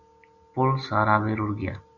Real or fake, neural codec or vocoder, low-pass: real; none; 7.2 kHz